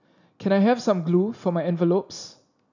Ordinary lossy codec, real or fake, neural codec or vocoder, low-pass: none; real; none; 7.2 kHz